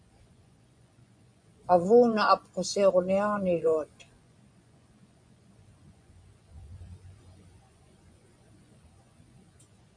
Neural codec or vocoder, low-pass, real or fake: vocoder, 24 kHz, 100 mel bands, Vocos; 9.9 kHz; fake